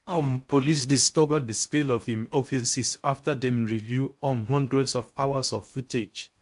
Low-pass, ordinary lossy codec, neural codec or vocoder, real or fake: 10.8 kHz; MP3, 64 kbps; codec, 16 kHz in and 24 kHz out, 0.6 kbps, FocalCodec, streaming, 4096 codes; fake